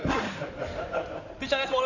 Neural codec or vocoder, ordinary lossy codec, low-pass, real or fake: codec, 16 kHz in and 24 kHz out, 2.2 kbps, FireRedTTS-2 codec; none; 7.2 kHz; fake